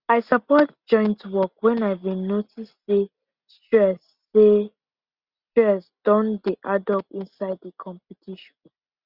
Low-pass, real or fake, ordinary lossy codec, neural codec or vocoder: 5.4 kHz; real; Opus, 64 kbps; none